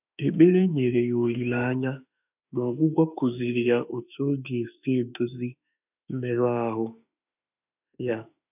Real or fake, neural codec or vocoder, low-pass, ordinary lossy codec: fake; autoencoder, 48 kHz, 32 numbers a frame, DAC-VAE, trained on Japanese speech; 3.6 kHz; none